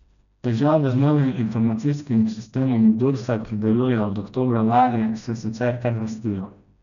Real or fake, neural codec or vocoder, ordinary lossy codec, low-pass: fake; codec, 16 kHz, 1 kbps, FreqCodec, smaller model; none; 7.2 kHz